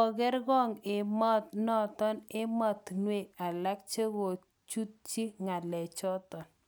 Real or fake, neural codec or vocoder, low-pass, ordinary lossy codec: real; none; none; none